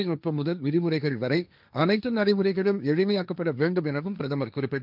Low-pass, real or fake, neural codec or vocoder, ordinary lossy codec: 5.4 kHz; fake; codec, 16 kHz, 1.1 kbps, Voila-Tokenizer; none